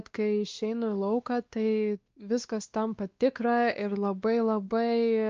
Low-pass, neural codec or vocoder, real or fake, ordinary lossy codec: 7.2 kHz; codec, 16 kHz, 2 kbps, X-Codec, WavLM features, trained on Multilingual LibriSpeech; fake; Opus, 24 kbps